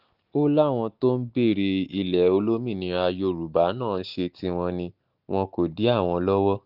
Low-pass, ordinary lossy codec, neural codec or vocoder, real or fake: 5.4 kHz; none; none; real